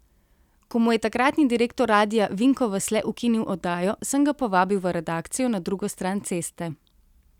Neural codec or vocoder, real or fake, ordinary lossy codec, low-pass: none; real; none; 19.8 kHz